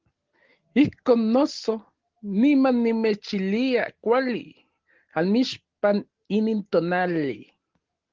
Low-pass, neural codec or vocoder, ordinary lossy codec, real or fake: 7.2 kHz; none; Opus, 16 kbps; real